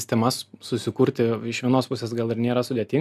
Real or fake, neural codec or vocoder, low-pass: real; none; 14.4 kHz